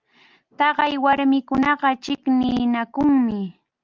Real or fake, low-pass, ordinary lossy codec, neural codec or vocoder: real; 7.2 kHz; Opus, 24 kbps; none